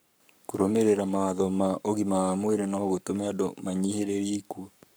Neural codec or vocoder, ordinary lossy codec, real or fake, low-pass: codec, 44.1 kHz, 7.8 kbps, Pupu-Codec; none; fake; none